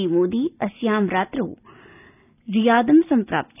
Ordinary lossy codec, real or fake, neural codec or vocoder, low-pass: none; real; none; 3.6 kHz